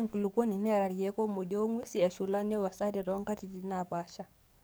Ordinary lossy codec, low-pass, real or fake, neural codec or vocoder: none; none; fake; codec, 44.1 kHz, 7.8 kbps, DAC